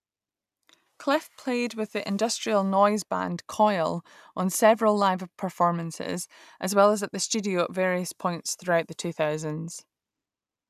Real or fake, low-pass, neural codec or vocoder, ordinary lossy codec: real; 14.4 kHz; none; none